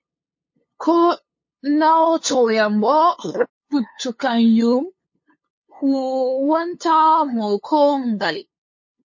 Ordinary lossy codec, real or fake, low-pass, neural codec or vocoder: MP3, 32 kbps; fake; 7.2 kHz; codec, 16 kHz, 2 kbps, FunCodec, trained on LibriTTS, 25 frames a second